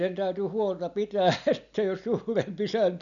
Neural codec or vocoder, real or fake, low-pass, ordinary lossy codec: none; real; 7.2 kHz; none